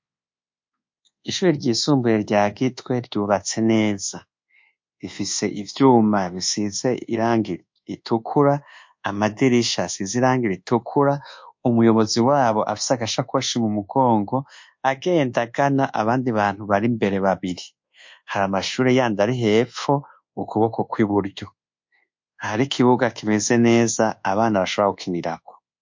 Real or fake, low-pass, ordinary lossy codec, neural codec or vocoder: fake; 7.2 kHz; MP3, 48 kbps; codec, 24 kHz, 1.2 kbps, DualCodec